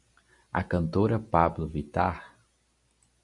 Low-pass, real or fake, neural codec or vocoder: 10.8 kHz; real; none